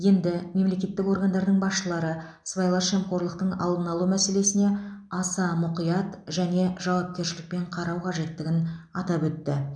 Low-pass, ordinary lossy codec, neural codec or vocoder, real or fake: 9.9 kHz; none; none; real